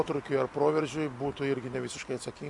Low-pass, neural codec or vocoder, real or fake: 10.8 kHz; none; real